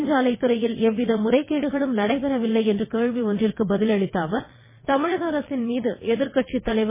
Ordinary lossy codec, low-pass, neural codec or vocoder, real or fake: MP3, 16 kbps; 3.6 kHz; vocoder, 22.05 kHz, 80 mel bands, WaveNeXt; fake